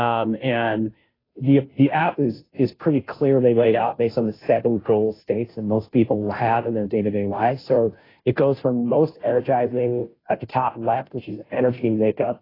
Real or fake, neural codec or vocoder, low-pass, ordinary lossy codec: fake; codec, 16 kHz, 0.5 kbps, FunCodec, trained on Chinese and English, 25 frames a second; 5.4 kHz; AAC, 24 kbps